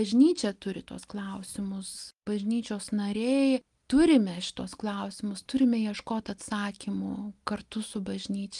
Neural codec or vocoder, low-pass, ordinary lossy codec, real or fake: none; 10.8 kHz; Opus, 32 kbps; real